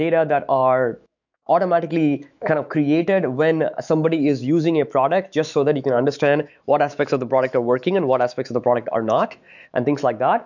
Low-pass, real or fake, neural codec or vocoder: 7.2 kHz; fake; autoencoder, 48 kHz, 128 numbers a frame, DAC-VAE, trained on Japanese speech